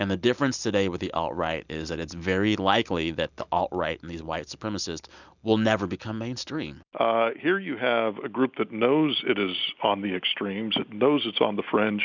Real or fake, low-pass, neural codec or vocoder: real; 7.2 kHz; none